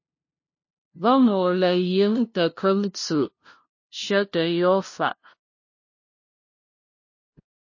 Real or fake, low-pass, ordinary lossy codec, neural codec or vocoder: fake; 7.2 kHz; MP3, 32 kbps; codec, 16 kHz, 0.5 kbps, FunCodec, trained on LibriTTS, 25 frames a second